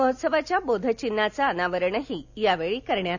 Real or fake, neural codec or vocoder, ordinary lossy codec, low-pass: real; none; none; 7.2 kHz